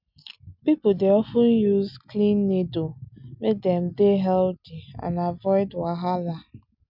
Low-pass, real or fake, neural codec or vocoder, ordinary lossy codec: 5.4 kHz; real; none; none